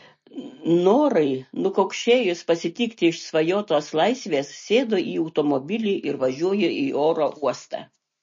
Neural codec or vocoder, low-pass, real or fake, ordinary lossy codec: none; 7.2 kHz; real; MP3, 32 kbps